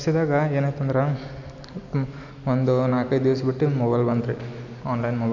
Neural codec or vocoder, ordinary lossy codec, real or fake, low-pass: none; none; real; 7.2 kHz